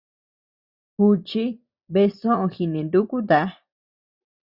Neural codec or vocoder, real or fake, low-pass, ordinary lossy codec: vocoder, 44.1 kHz, 128 mel bands every 256 samples, BigVGAN v2; fake; 5.4 kHz; Opus, 64 kbps